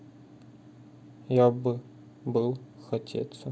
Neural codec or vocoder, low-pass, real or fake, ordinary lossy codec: none; none; real; none